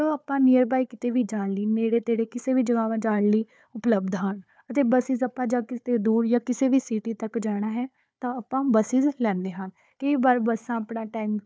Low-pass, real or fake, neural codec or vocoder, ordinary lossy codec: none; fake; codec, 16 kHz, 4 kbps, FunCodec, trained on Chinese and English, 50 frames a second; none